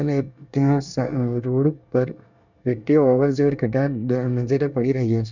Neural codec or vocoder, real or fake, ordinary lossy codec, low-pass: codec, 24 kHz, 1 kbps, SNAC; fake; none; 7.2 kHz